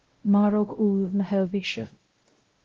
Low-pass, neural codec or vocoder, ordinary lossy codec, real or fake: 7.2 kHz; codec, 16 kHz, 0.5 kbps, X-Codec, WavLM features, trained on Multilingual LibriSpeech; Opus, 16 kbps; fake